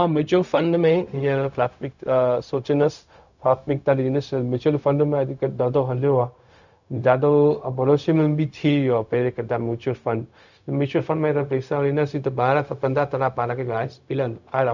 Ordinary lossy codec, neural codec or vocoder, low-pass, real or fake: none; codec, 16 kHz, 0.4 kbps, LongCat-Audio-Codec; 7.2 kHz; fake